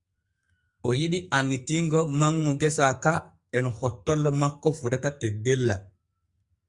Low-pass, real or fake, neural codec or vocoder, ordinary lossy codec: 10.8 kHz; fake; codec, 44.1 kHz, 2.6 kbps, SNAC; Opus, 64 kbps